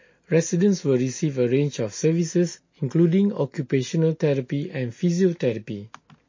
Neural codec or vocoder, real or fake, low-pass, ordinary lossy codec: none; real; 7.2 kHz; MP3, 32 kbps